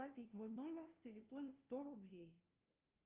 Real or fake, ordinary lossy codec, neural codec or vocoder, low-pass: fake; Opus, 32 kbps; codec, 16 kHz, 0.5 kbps, FunCodec, trained on LibriTTS, 25 frames a second; 3.6 kHz